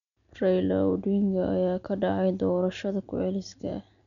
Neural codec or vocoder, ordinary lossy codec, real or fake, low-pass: none; none; real; 7.2 kHz